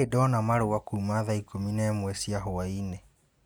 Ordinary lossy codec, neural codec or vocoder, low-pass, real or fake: none; none; none; real